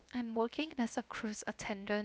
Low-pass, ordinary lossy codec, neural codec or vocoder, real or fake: none; none; codec, 16 kHz, 0.7 kbps, FocalCodec; fake